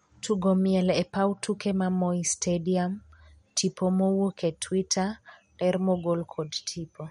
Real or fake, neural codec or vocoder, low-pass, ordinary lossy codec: real; none; 19.8 kHz; MP3, 48 kbps